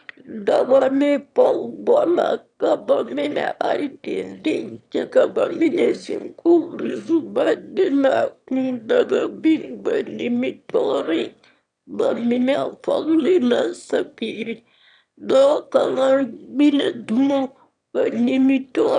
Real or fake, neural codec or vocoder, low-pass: fake; autoencoder, 22.05 kHz, a latent of 192 numbers a frame, VITS, trained on one speaker; 9.9 kHz